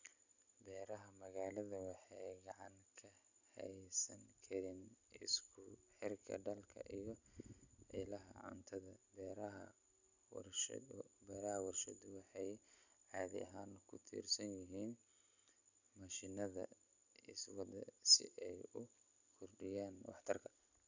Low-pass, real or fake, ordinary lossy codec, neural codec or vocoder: 7.2 kHz; real; none; none